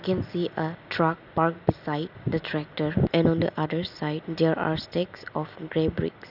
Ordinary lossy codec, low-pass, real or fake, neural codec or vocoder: none; 5.4 kHz; real; none